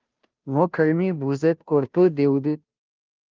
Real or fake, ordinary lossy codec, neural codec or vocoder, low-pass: fake; Opus, 24 kbps; codec, 16 kHz, 0.5 kbps, FunCodec, trained on Chinese and English, 25 frames a second; 7.2 kHz